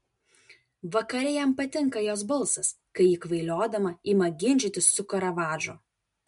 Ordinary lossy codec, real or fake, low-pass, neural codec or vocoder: MP3, 64 kbps; real; 10.8 kHz; none